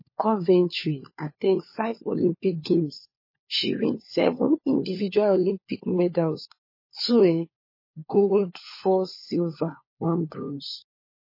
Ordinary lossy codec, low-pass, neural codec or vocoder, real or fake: MP3, 24 kbps; 5.4 kHz; codec, 16 kHz, 4 kbps, FunCodec, trained on LibriTTS, 50 frames a second; fake